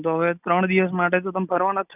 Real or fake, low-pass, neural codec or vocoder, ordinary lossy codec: real; 3.6 kHz; none; none